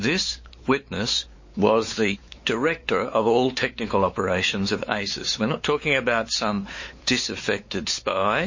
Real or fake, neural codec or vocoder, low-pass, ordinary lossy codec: fake; codec, 16 kHz, 4 kbps, X-Codec, WavLM features, trained on Multilingual LibriSpeech; 7.2 kHz; MP3, 32 kbps